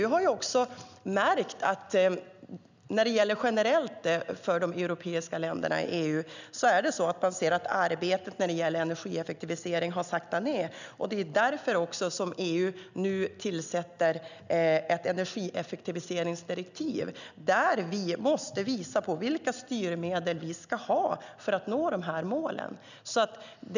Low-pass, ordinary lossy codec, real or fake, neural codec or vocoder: 7.2 kHz; none; real; none